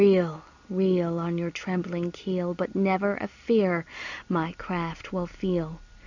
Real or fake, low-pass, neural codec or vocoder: real; 7.2 kHz; none